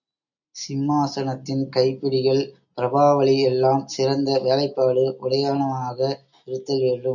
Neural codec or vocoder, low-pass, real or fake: none; 7.2 kHz; real